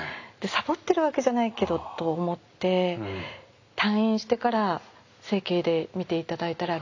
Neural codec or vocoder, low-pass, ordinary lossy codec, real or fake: none; 7.2 kHz; AAC, 48 kbps; real